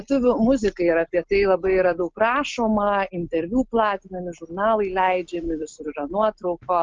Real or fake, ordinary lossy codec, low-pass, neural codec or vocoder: real; Opus, 24 kbps; 7.2 kHz; none